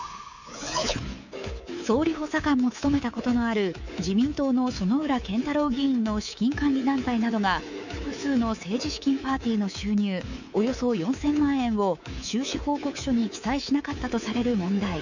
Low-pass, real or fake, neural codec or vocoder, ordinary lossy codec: 7.2 kHz; fake; codec, 24 kHz, 3.1 kbps, DualCodec; none